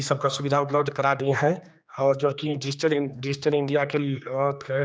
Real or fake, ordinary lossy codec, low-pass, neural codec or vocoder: fake; none; none; codec, 16 kHz, 2 kbps, X-Codec, HuBERT features, trained on general audio